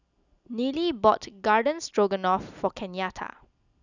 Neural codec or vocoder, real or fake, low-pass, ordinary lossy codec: none; real; 7.2 kHz; none